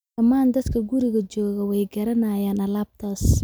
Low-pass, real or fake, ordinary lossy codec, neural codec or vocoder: none; real; none; none